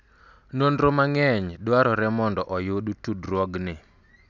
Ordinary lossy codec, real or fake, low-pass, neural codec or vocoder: none; real; 7.2 kHz; none